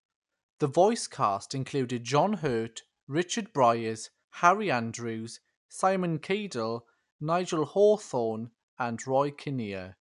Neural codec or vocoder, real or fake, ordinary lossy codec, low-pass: none; real; none; 10.8 kHz